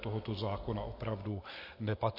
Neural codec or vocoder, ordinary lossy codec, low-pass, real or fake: none; MP3, 32 kbps; 5.4 kHz; real